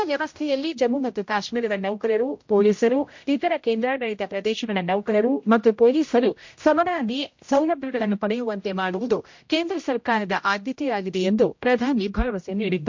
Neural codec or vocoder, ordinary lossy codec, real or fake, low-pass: codec, 16 kHz, 0.5 kbps, X-Codec, HuBERT features, trained on general audio; MP3, 48 kbps; fake; 7.2 kHz